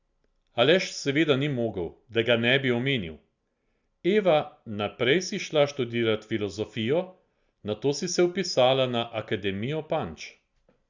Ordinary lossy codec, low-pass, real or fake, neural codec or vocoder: Opus, 64 kbps; 7.2 kHz; real; none